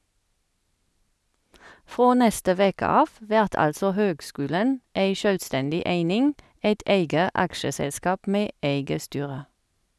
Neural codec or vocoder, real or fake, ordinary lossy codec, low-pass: none; real; none; none